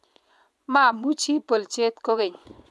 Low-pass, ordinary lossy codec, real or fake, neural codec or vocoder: none; none; fake; vocoder, 24 kHz, 100 mel bands, Vocos